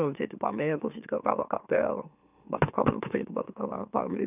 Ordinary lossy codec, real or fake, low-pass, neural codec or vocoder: none; fake; 3.6 kHz; autoencoder, 44.1 kHz, a latent of 192 numbers a frame, MeloTTS